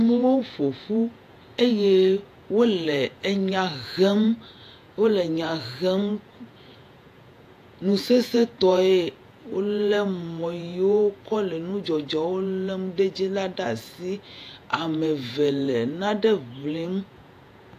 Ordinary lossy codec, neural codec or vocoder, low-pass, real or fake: AAC, 64 kbps; vocoder, 48 kHz, 128 mel bands, Vocos; 14.4 kHz; fake